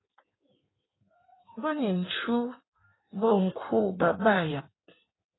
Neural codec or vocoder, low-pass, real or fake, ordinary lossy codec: codec, 16 kHz in and 24 kHz out, 1.1 kbps, FireRedTTS-2 codec; 7.2 kHz; fake; AAC, 16 kbps